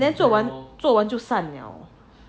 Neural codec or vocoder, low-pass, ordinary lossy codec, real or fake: none; none; none; real